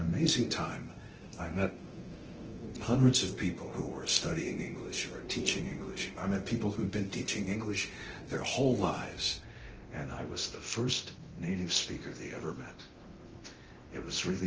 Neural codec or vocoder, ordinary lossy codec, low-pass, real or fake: codec, 24 kHz, 0.9 kbps, DualCodec; Opus, 16 kbps; 7.2 kHz; fake